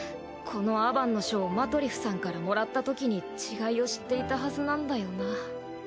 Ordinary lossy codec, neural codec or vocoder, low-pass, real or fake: none; none; none; real